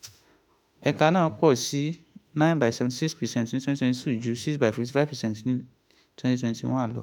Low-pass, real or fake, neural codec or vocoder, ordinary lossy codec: 19.8 kHz; fake; autoencoder, 48 kHz, 32 numbers a frame, DAC-VAE, trained on Japanese speech; none